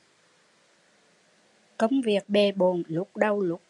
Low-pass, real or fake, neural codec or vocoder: 10.8 kHz; real; none